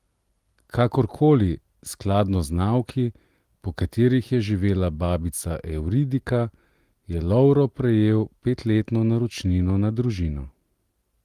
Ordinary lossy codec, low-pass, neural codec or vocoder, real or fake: Opus, 24 kbps; 14.4 kHz; none; real